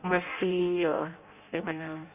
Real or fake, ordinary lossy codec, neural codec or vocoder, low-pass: fake; none; codec, 16 kHz in and 24 kHz out, 0.6 kbps, FireRedTTS-2 codec; 3.6 kHz